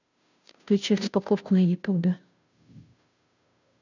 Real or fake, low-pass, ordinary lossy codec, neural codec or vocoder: fake; 7.2 kHz; none; codec, 16 kHz, 0.5 kbps, FunCodec, trained on Chinese and English, 25 frames a second